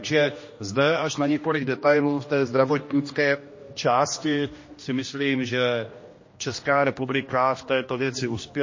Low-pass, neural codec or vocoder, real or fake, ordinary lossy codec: 7.2 kHz; codec, 16 kHz, 1 kbps, X-Codec, HuBERT features, trained on general audio; fake; MP3, 32 kbps